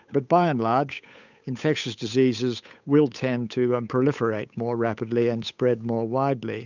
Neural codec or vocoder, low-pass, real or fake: codec, 16 kHz, 8 kbps, FunCodec, trained on Chinese and English, 25 frames a second; 7.2 kHz; fake